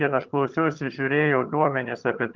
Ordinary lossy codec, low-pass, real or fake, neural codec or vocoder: Opus, 32 kbps; 7.2 kHz; fake; vocoder, 22.05 kHz, 80 mel bands, HiFi-GAN